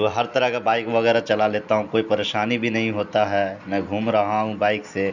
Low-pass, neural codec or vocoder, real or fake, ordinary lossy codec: 7.2 kHz; none; real; none